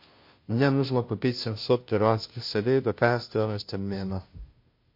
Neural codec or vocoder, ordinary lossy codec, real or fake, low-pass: codec, 16 kHz, 0.5 kbps, FunCodec, trained on Chinese and English, 25 frames a second; MP3, 32 kbps; fake; 5.4 kHz